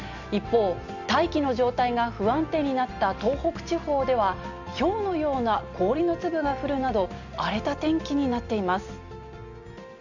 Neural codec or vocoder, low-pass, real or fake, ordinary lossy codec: none; 7.2 kHz; real; none